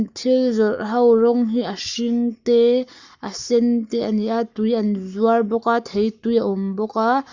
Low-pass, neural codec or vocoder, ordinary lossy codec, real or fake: 7.2 kHz; codec, 44.1 kHz, 7.8 kbps, Pupu-Codec; Opus, 64 kbps; fake